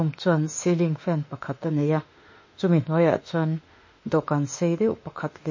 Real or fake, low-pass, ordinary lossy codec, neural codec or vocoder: fake; 7.2 kHz; MP3, 32 kbps; autoencoder, 48 kHz, 32 numbers a frame, DAC-VAE, trained on Japanese speech